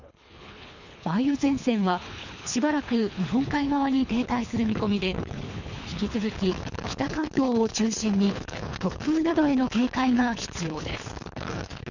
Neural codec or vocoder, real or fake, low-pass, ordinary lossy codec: codec, 24 kHz, 3 kbps, HILCodec; fake; 7.2 kHz; none